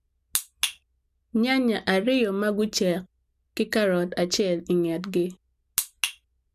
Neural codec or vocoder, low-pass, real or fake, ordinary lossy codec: none; 14.4 kHz; real; none